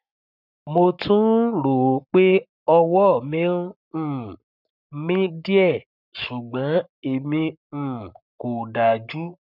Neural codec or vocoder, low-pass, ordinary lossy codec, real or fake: codec, 44.1 kHz, 7.8 kbps, Pupu-Codec; 5.4 kHz; none; fake